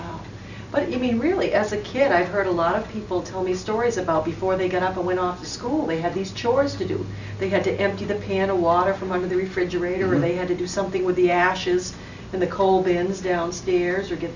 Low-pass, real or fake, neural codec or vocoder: 7.2 kHz; real; none